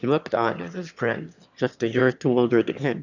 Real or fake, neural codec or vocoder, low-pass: fake; autoencoder, 22.05 kHz, a latent of 192 numbers a frame, VITS, trained on one speaker; 7.2 kHz